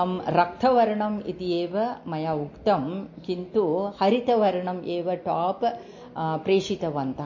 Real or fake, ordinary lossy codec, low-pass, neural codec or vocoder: real; MP3, 32 kbps; 7.2 kHz; none